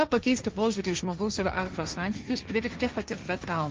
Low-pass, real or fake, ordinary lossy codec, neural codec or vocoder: 7.2 kHz; fake; Opus, 24 kbps; codec, 16 kHz, 1.1 kbps, Voila-Tokenizer